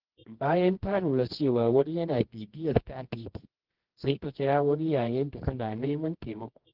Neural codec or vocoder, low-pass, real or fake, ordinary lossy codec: codec, 24 kHz, 0.9 kbps, WavTokenizer, medium music audio release; 5.4 kHz; fake; Opus, 16 kbps